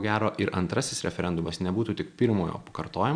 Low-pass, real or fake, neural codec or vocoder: 9.9 kHz; real; none